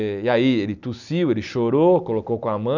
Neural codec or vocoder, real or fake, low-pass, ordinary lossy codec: none; real; 7.2 kHz; none